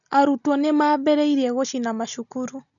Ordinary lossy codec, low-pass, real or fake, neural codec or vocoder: none; 7.2 kHz; real; none